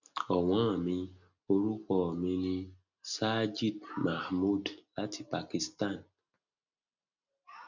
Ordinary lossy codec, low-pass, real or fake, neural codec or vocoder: none; 7.2 kHz; real; none